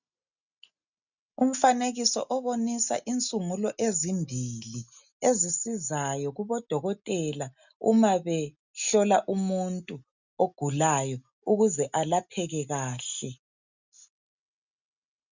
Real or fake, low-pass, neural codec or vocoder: real; 7.2 kHz; none